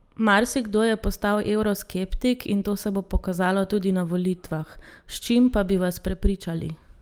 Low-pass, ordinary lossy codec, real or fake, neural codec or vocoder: 19.8 kHz; Opus, 32 kbps; real; none